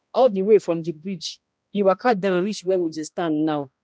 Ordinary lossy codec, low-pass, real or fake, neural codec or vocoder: none; none; fake; codec, 16 kHz, 1 kbps, X-Codec, HuBERT features, trained on balanced general audio